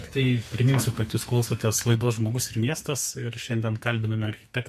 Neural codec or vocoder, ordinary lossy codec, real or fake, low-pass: codec, 44.1 kHz, 2.6 kbps, SNAC; MP3, 64 kbps; fake; 14.4 kHz